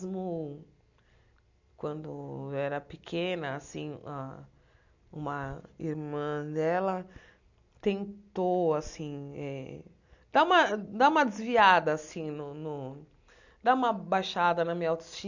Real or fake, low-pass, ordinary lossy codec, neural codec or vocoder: real; 7.2 kHz; none; none